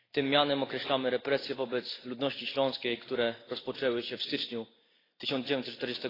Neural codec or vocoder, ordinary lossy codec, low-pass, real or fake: none; AAC, 24 kbps; 5.4 kHz; real